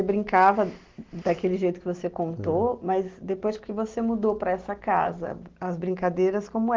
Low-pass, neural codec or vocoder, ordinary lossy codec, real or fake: 7.2 kHz; none; Opus, 16 kbps; real